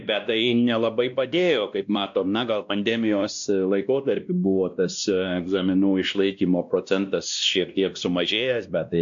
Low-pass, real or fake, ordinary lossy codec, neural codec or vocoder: 7.2 kHz; fake; MP3, 64 kbps; codec, 16 kHz, 1 kbps, X-Codec, WavLM features, trained on Multilingual LibriSpeech